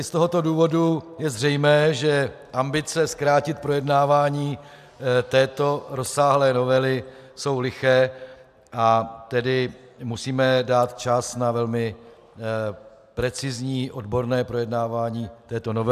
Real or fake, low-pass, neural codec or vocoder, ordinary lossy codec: real; 14.4 kHz; none; AAC, 96 kbps